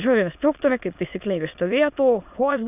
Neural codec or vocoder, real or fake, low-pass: autoencoder, 22.05 kHz, a latent of 192 numbers a frame, VITS, trained on many speakers; fake; 3.6 kHz